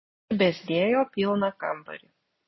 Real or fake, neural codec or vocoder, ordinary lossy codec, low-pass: real; none; MP3, 24 kbps; 7.2 kHz